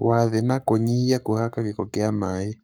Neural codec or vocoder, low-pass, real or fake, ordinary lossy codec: codec, 44.1 kHz, 7.8 kbps, Pupu-Codec; none; fake; none